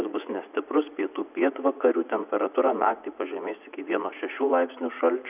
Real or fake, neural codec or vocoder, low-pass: fake; vocoder, 44.1 kHz, 80 mel bands, Vocos; 3.6 kHz